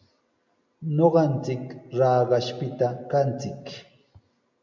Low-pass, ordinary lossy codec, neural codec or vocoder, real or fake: 7.2 kHz; MP3, 64 kbps; none; real